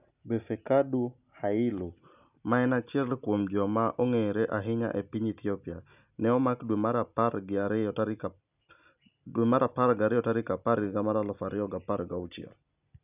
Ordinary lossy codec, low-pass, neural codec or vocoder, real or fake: none; 3.6 kHz; none; real